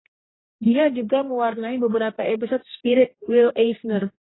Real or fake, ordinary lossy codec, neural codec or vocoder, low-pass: fake; AAC, 16 kbps; codec, 16 kHz, 1 kbps, X-Codec, HuBERT features, trained on general audio; 7.2 kHz